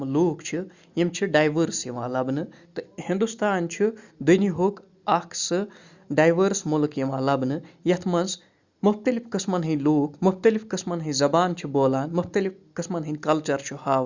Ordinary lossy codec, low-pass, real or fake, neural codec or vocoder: Opus, 64 kbps; 7.2 kHz; real; none